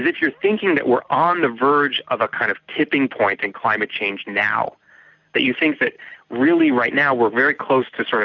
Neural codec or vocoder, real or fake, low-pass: none; real; 7.2 kHz